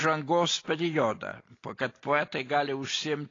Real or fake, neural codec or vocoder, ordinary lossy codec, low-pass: real; none; AAC, 32 kbps; 7.2 kHz